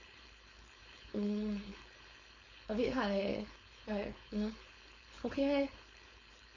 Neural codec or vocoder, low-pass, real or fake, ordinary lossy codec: codec, 16 kHz, 4.8 kbps, FACodec; 7.2 kHz; fake; MP3, 48 kbps